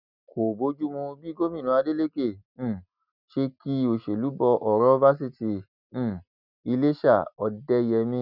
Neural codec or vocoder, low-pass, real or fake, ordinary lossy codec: none; 5.4 kHz; real; none